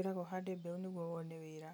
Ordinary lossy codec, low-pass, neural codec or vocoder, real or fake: none; none; none; real